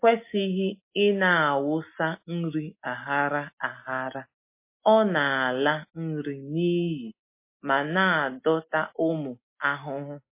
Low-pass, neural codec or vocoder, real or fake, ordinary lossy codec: 3.6 kHz; none; real; MP3, 24 kbps